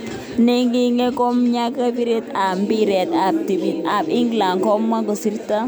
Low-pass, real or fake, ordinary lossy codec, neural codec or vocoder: none; real; none; none